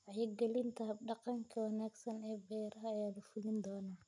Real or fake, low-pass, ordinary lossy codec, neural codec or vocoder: real; 10.8 kHz; none; none